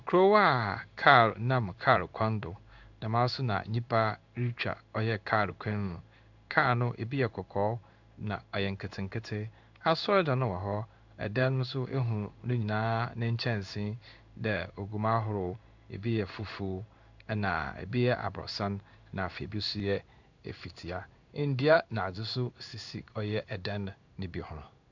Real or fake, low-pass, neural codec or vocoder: fake; 7.2 kHz; codec, 16 kHz in and 24 kHz out, 1 kbps, XY-Tokenizer